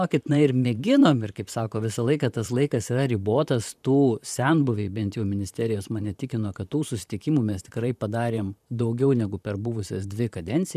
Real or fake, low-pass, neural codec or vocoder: fake; 14.4 kHz; vocoder, 44.1 kHz, 128 mel bands, Pupu-Vocoder